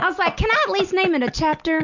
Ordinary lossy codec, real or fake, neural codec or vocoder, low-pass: Opus, 64 kbps; real; none; 7.2 kHz